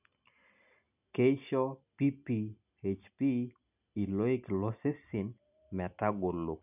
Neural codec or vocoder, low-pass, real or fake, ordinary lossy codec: none; 3.6 kHz; real; none